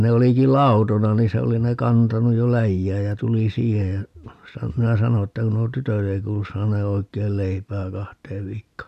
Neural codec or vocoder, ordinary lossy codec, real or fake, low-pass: none; none; real; 14.4 kHz